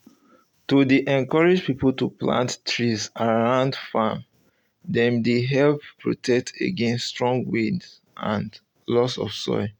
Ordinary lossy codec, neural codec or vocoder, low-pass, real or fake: none; none; 19.8 kHz; real